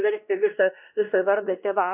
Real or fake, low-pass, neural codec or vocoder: fake; 3.6 kHz; codec, 16 kHz, 1 kbps, X-Codec, WavLM features, trained on Multilingual LibriSpeech